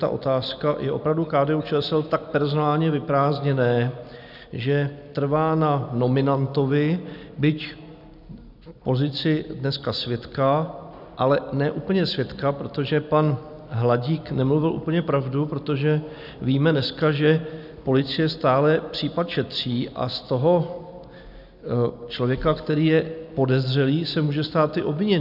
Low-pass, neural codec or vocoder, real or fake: 5.4 kHz; none; real